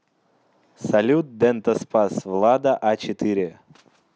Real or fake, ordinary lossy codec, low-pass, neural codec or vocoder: real; none; none; none